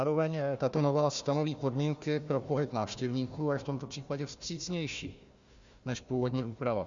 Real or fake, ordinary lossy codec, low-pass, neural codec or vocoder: fake; Opus, 64 kbps; 7.2 kHz; codec, 16 kHz, 1 kbps, FunCodec, trained on Chinese and English, 50 frames a second